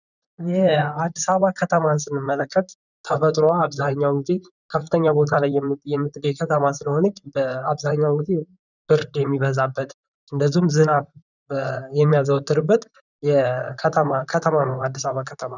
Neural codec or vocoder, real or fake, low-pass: vocoder, 44.1 kHz, 128 mel bands, Pupu-Vocoder; fake; 7.2 kHz